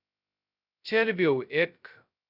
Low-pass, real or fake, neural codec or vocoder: 5.4 kHz; fake; codec, 16 kHz, 0.2 kbps, FocalCodec